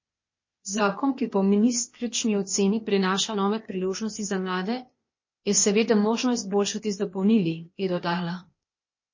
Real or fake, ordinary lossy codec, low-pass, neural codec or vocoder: fake; MP3, 32 kbps; 7.2 kHz; codec, 16 kHz, 0.8 kbps, ZipCodec